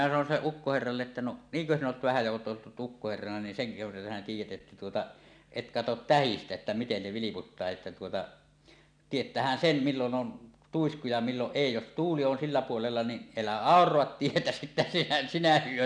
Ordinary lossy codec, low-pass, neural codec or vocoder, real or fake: none; 9.9 kHz; none; real